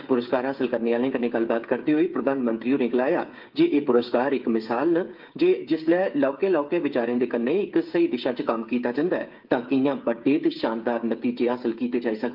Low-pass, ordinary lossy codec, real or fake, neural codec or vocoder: 5.4 kHz; Opus, 32 kbps; fake; codec, 16 kHz, 8 kbps, FreqCodec, smaller model